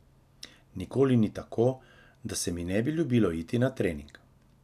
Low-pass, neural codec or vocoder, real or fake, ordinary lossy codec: 14.4 kHz; none; real; none